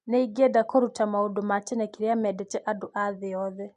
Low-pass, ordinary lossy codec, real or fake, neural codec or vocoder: 10.8 kHz; MP3, 48 kbps; real; none